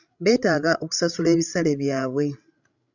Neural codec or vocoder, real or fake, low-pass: codec, 16 kHz, 16 kbps, FreqCodec, larger model; fake; 7.2 kHz